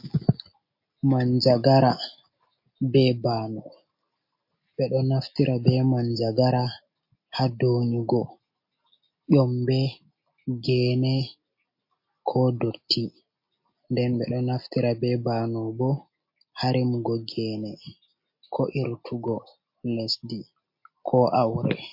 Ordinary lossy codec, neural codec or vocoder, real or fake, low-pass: MP3, 32 kbps; none; real; 5.4 kHz